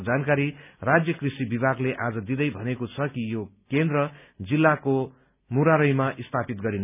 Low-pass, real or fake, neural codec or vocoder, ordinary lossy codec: 3.6 kHz; real; none; none